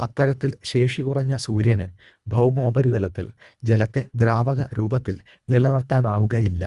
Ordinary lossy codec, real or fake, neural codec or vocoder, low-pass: Opus, 64 kbps; fake; codec, 24 kHz, 1.5 kbps, HILCodec; 10.8 kHz